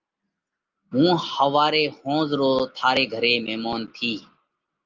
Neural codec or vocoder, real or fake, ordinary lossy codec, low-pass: none; real; Opus, 32 kbps; 7.2 kHz